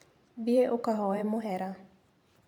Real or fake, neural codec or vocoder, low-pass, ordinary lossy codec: fake; vocoder, 44.1 kHz, 128 mel bands every 512 samples, BigVGAN v2; 19.8 kHz; none